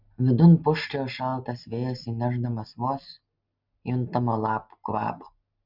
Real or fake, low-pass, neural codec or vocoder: real; 5.4 kHz; none